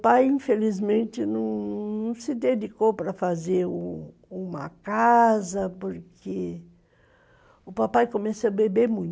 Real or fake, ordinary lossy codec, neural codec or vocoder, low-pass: real; none; none; none